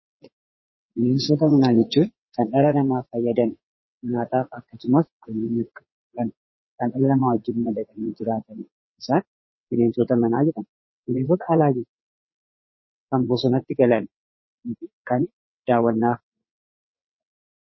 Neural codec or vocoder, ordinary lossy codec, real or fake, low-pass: vocoder, 22.05 kHz, 80 mel bands, WaveNeXt; MP3, 24 kbps; fake; 7.2 kHz